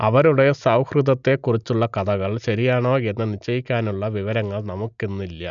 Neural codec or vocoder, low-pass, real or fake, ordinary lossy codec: none; 7.2 kHz; real; none